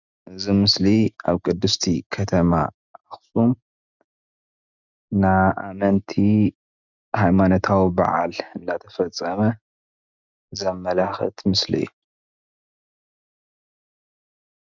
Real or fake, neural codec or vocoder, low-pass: real; none; 7.2 kHz